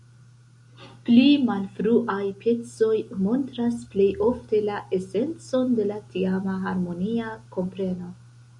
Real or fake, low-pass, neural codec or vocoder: real; 10.8 kHz; none